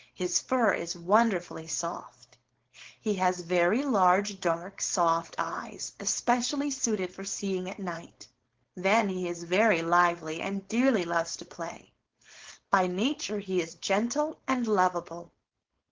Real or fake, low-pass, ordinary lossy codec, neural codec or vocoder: fake; 7.2 kHz; Opus, 16 kbps; codec, 16 kHz, 4.8 kbps, FACodec